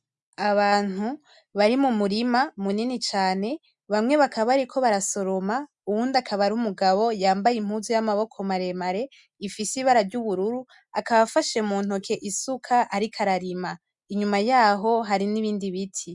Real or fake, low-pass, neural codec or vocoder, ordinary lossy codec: real; 10.8 kHz; none; MP3, 96 kbps